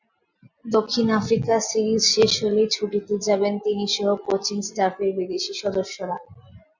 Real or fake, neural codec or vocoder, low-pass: real; none; 7.2 kHz